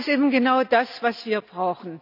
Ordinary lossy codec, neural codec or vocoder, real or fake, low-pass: none; none; real; 5.4 kHz